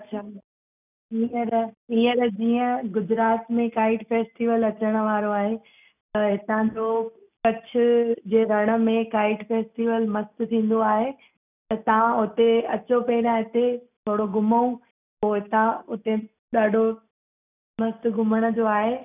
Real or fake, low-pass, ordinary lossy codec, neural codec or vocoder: real; 3.6 kHz; none; none